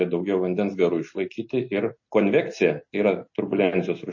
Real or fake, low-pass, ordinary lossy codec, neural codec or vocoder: real; 7.2 kHz; MP3, 32 kbps; none